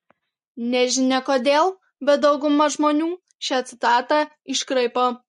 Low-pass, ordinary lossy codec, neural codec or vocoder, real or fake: 10.8 kHz; MP3, 48 kbps; none; real